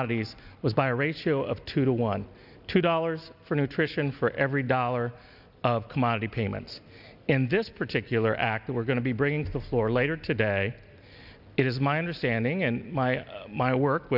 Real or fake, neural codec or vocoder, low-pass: real; none; 5.4 kHz